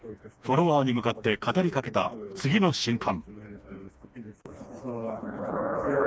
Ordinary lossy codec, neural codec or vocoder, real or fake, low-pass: none; codec, 16 kHz, 2 kbps, FreqCodec, smaller model; fake; none